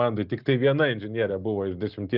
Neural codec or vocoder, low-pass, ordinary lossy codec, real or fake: none; 5.4 kHz; Opus, 32 kbps; real